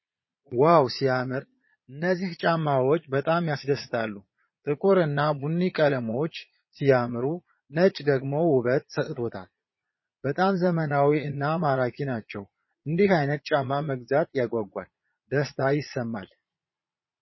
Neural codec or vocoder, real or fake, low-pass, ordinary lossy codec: vocoder, 22.05 kHz, 80 mel bands, Vocos; fake; 7.2 kHz; MP3, 24 kbps